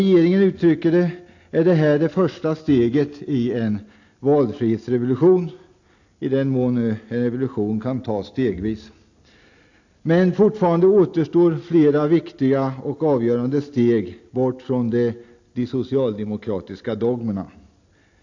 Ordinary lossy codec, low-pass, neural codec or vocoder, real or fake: AAC, 48 kbps; 7.2 kHz; none; real